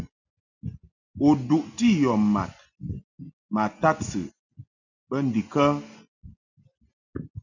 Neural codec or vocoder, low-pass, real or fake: none; 7.2 kHz; real